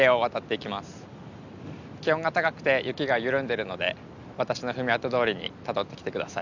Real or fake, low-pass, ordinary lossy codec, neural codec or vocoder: real; 7.2 kHz; none; none